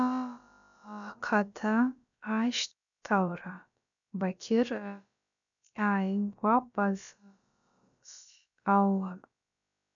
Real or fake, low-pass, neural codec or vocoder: fake; 7.2 kHz; codec, 16 kHz, about 1 kbps, DyCAST, with the encoder's durations